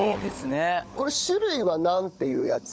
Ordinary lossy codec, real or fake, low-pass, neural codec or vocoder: none; fake; none; codec, 16 kHz, 16 kbps, FunCodec, trained on LibriTTS, 50 frames a second